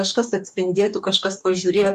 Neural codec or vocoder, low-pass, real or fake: codec, 44.1 kHz, 2.6 kbps, DAC; 14.4 kHz; fake